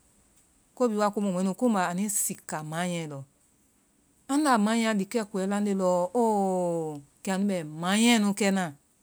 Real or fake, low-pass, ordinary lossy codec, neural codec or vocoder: real; none; none; none